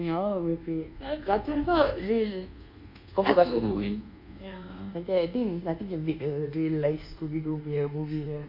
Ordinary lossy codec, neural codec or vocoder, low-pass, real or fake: MP3, 48 kbps; codec, 24 kHz, 1.2 kbps, DualCodec; 5.4 kHz; fake